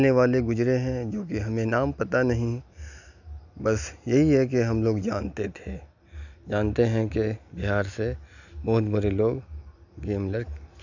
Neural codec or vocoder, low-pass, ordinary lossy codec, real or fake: none; 7.2 kHz; none; real